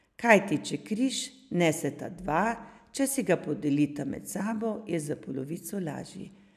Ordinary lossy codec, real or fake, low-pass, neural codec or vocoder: none; real; 14.4 kHz; none